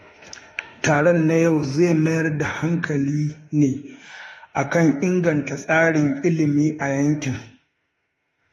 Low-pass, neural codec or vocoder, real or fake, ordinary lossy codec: 19.8 kHz; autoencoder, 48 kHz, 32 numbers a frame, DAC-VAE, trained on Japanese speech; fake; AAC, 32 kbps